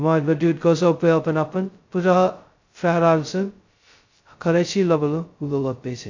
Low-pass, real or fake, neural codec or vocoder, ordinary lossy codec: 7.2 kHz; fake; codec, 16 kHz, 0.2 kbps, FocalCodec; AAC, 48 kbps